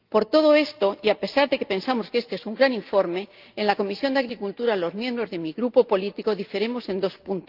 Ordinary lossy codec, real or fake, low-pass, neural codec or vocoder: Opus, 32 kbps; real; 5.4 kHz; none